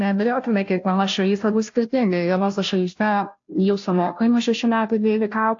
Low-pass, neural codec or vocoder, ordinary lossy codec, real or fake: 7.2 kHz; codec, 16 kHz, 0.5 kbps, FunCodec, trained on Chinese and English, 25 frames a second; AAC, 48 kbps; fake